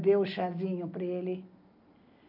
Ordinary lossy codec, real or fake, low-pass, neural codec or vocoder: none; real; 5.4 kHz; none